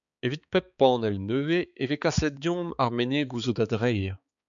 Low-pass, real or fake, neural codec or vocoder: 7.2 kHz; fake; codec, 16 kHz, 4 kbps, X-Codec, HuBERT features, trained on balanced general audio